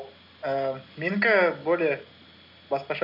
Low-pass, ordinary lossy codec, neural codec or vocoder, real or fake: 5.4 kHz; none; none; real